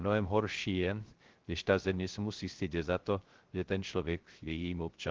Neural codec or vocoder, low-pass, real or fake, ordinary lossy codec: codec, 16 kHz, 0.3 kbps, FocalCodec; 7.2 kHz; fake; Opus, 16 kbps